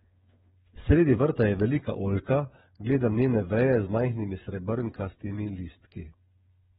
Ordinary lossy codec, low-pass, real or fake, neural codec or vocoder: AAC, 16 kbps; 7.2 kHz; fake; codec, 16 kHz, 8 kbps, FreqCodec, smaller model